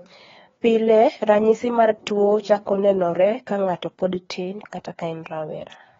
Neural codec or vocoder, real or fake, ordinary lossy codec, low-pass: codec, 16 kHz, 2 kbps, FreqCodec, larger model; fake; AAC, 24 kbps; 7.2 kHz